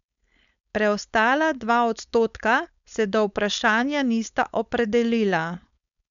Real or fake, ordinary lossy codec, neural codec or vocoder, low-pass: fake; MP3, 96 kbps; codec, 16 kHz, 4.8 kbps, FACodec; 7.2 kHz